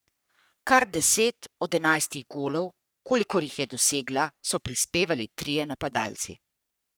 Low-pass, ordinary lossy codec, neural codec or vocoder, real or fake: none; none; codec, 44.1 kHz, 3.4 kbps, Pupu-Codec; fake